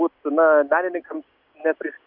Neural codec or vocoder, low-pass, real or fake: none; 5.4 kHz; real